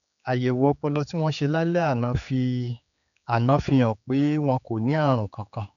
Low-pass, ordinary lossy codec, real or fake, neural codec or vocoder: 7.2 kHz; none; fake; codec, 16 kHz, 4 kbps, X-Codec, HuBERT features, trained on general audio